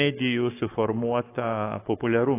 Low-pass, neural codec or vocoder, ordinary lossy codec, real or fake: 3.6 kHz; codec, 44.1 kHz, 7.8 kbps, Pupu-Codec; AAC, 24 kbps; fake